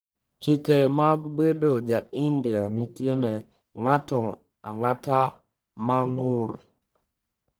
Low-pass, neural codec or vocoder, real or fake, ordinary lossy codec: none; codec, 44.1 kHz, 1.7 kbps, Pupu-Codec; fake; none